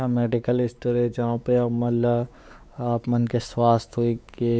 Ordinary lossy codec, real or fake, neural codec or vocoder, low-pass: none; fake; codec, 16 kHz, 4 kbps, X-Codec, WavLM features, trained on Multilingual LibriSpeech; none